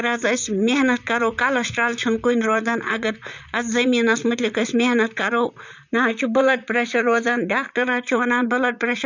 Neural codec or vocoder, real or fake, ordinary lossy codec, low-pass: vocoder, 44.1 kHz, 128 mel bands, Pupu-Vocoder; fake; none; 7.2 kHz